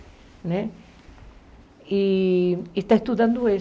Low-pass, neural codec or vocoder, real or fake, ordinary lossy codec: none; none; real; none